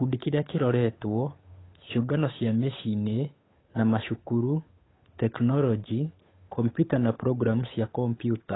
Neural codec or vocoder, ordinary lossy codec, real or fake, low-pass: codec, 16 kHz, 8 kbps, FunCodec, trained on Chinese and English, 25 frames a second; AAC, 16 kbps; fake; 7.2 kHz